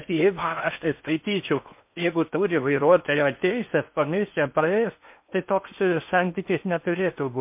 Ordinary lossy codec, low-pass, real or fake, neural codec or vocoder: MP3, 32 kbps; 3.6 kHz; fake; codec, 16 kHz in and 24 kHz out, 0.6 kbps, FocalCodec, streaming, 4096 codes